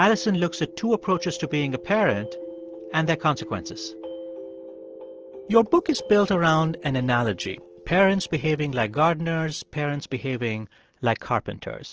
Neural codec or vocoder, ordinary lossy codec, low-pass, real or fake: none; Opus, 16 kbps; 7.2 kHz; real